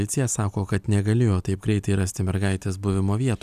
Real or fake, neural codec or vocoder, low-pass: real; none; 14.4 kHz